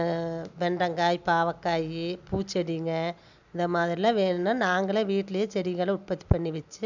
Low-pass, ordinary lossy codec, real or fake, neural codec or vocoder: 7.2 kHz; none; real; none